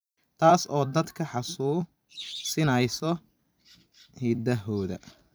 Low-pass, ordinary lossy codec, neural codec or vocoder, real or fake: none; none; vocoder, 44.1 kHz, 128 mel bands every 256 samples, BigVGAN v2; fake